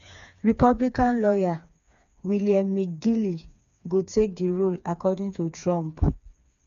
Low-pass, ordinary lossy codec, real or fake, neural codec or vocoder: 7.2 kHz; none; fake; codec, 16 kHz, 4 kbps, FreqCodec, smaller model